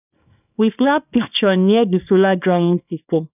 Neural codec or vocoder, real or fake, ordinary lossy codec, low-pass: codec, 24 kHz, 0.9 kbps, WavTokenizer, small release; fake; none; 3.6 kHz